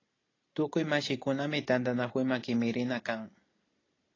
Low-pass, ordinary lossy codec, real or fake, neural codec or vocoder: 7.2 kHz; AAC, 32 kbps; real; none